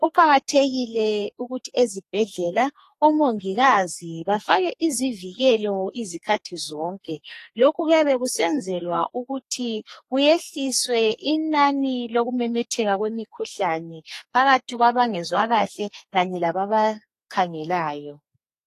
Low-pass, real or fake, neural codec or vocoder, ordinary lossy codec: 14.4 kHz; fake; codec, 32 kHz, 1.9 kbps, SNAC; AAC, 48 kbps